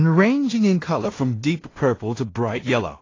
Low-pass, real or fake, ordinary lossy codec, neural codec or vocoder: 7.2 kHz; fake; AAC, 32 kbps; codec, 16 kHz in and 24 kHz out, 0.4 kbps, LongCat-Audio-Codec, two codebook decoder